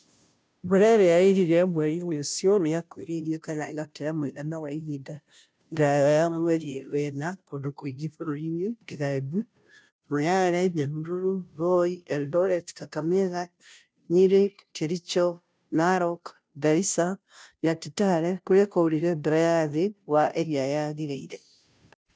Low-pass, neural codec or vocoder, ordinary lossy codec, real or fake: none; codec, 16 kHz, 0.5 kbps, FunCodec, trained on Chinese and English, 25 frames a second; none; fake